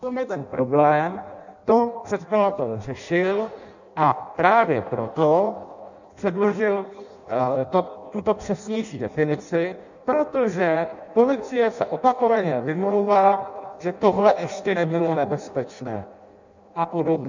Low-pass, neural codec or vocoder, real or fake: 7.2 kHz; codec, 16 kHz in and 24 kHz out, 0.6 kbps, FireRedTTS-2 codec; fake